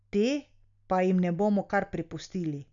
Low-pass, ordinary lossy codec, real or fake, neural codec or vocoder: 7.2 kHz; none; real; none